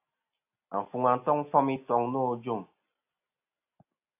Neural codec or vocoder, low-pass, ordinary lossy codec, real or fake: none; 3.6 kHz; AAC, 32 kbps; real